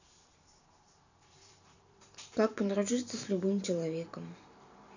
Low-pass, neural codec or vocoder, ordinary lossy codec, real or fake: 7.2 kHz; vocoder, 44.1 kHz, 128 mel bands every 512 samples, BigVGAN v2; none; fake